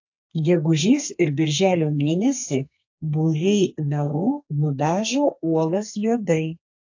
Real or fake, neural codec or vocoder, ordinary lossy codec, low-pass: fake; codec, 32 kHz, 1.9 kbps, SNAC; AAC, 48 kbps; 7.2 kHz